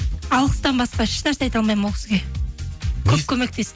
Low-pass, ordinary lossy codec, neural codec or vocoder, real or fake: none; none; none; real